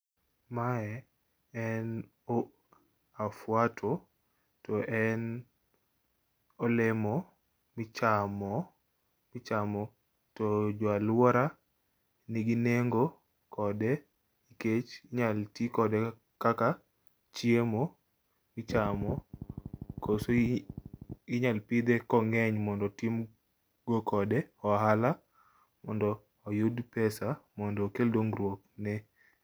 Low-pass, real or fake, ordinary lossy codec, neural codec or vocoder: none; real; none; none